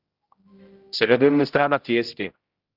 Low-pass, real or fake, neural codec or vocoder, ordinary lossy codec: 5.4 kHz; fake; codec, 16 kHz, 0.5 kbps, X-Codec, HuBERT features, trained on general audio; Opus, 24 kbps